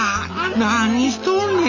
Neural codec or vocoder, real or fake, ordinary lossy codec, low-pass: none; real; none; 7.2 kHz